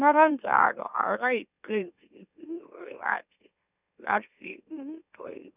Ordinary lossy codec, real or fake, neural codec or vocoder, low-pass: none; fake; autoencoder, 44.1 kHz, a latent of 192 numbers a frame, MeloTTS; 3.6 kHz